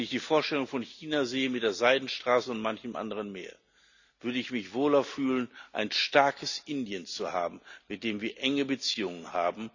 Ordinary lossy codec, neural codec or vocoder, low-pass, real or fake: none; none; 7.2 kHz; real